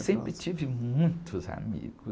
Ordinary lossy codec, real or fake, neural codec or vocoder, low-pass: none; real; none; none